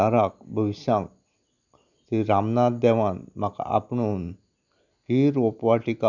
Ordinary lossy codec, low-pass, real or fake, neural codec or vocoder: none; 7.2 kHz; real; none